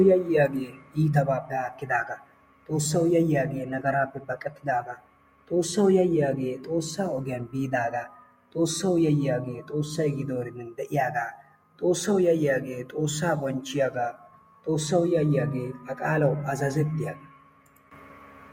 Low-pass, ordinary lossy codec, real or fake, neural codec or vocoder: 19.8 kHz; MP3, 64 kbps; fake; vocoder, 48 kHz, 128 mel bands, Vocos